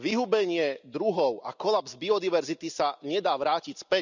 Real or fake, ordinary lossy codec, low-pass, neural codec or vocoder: real; none; 7.2 kHz; none